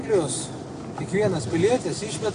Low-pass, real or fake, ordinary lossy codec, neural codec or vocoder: 9.9 kHz; real; AAC, 48 kbps; none